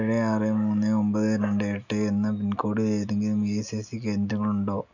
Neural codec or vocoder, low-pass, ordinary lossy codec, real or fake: none; 7.2 kHz; none; real